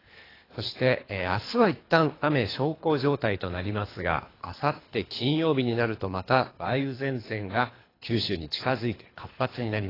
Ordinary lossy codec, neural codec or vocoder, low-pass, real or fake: AAC, 24 kbps; codec, 24 kHz, 3 kbps, HILCodec; 5.4 kHz; fake